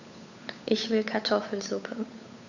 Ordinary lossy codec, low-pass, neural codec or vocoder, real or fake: none; 7.2 kHz; codec, 16 kHz, 8 kbps, FunCodec, trained on Chinese and English, 25 frames a second; fake